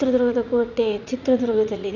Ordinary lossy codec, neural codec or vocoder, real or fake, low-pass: none; vocoder, 44.1 kHz, 80 mel bands, Vocos; fake; 7.2 kHz